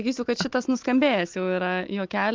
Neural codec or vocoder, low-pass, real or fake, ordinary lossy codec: none; 7.2 kHz; real; Opus, 24 kbps